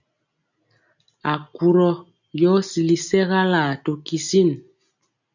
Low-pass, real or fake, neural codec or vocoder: 7.2 kHz; real; none